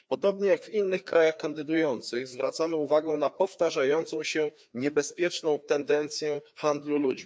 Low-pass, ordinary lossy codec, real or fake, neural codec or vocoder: none; none; fake; codec, 16 kHz, 2 kbps, FreqCodec, larger model